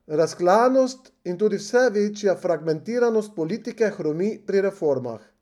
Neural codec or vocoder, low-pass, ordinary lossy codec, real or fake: none; 19.8 kHz; none; real